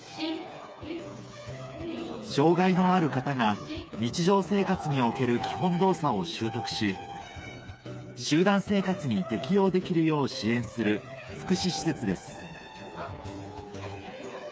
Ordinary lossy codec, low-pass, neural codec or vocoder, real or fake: none; none; codec, 16 kHz, 4 kbps, FreqCodec, smaller model; fake